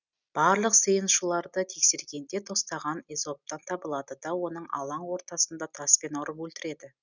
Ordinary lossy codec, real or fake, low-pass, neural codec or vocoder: none; real; 7.2 kHz; none